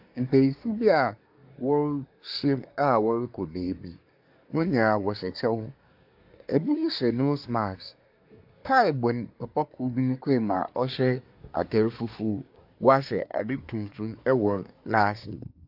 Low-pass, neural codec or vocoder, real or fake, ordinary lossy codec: 5.4 kHz; codec, 24 kHz, 1 kbps, SNAC; fake; AAC, 48 kbps